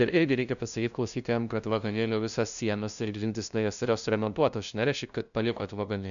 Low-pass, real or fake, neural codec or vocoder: 7.2 kHz; fake; codec, 16 kHz, 0.5 kbps, FunCodec, trained on LibriTTS, 25 frames a second